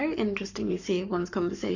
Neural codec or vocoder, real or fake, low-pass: codec, 44.1 kHz, 7.8 kbps, Pupu-Codec; fake; 7.2 kHz